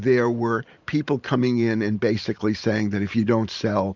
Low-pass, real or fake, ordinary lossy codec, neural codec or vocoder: 7.2 kHz; fake; Opus, 64 kbps; vocoder, 44.1 kHz, 128 mel bands every 512 samples, BigVGAN v2